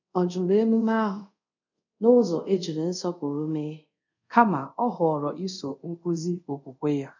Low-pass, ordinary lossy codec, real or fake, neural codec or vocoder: 7.2 kHz; none; fake; codec, 24 kHz, 0.5 kbps, DualCodec